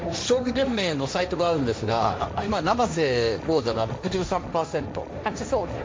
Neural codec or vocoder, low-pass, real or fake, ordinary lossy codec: codec, 16 kHz, 1.1 kbps, Voila-Tokenizer; none; fake; none